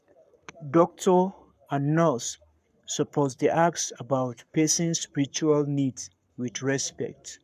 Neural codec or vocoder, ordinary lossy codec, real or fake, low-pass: codec, 44.1 kHz, 7.8 kbps, Pupu-Codec; none; fake; 14.4 kHz